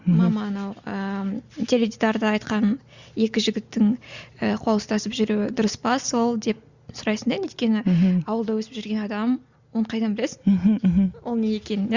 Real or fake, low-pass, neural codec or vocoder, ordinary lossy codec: real; 7.2 kHz; none; Opus, 64 kbps